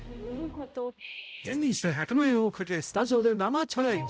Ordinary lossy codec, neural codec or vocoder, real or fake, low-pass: none; codec, 16 kHz, 0.5 kbps, X-Codec, HuBERT features, trained on balanced general audio; fake; none